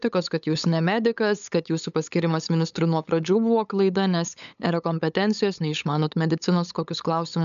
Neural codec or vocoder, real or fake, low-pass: codec, 16 kHz, 8 kbps, FunCodec, trained on LibriTTS, 25 frames a second; fake; 7.2 kHz